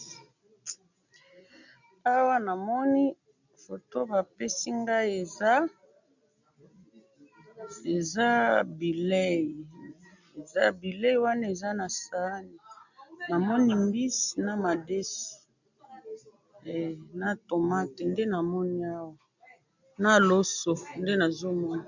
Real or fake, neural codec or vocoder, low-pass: real; none; 7.2 kHz